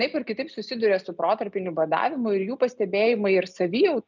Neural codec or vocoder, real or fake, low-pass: none; real; 7.2 kHz